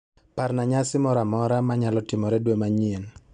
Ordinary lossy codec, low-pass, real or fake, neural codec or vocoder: none; 9.9 kHz; real; none